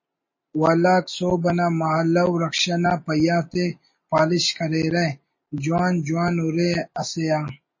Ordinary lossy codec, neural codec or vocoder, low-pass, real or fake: MP3, 32 kbps; none; 7.2 kHz; real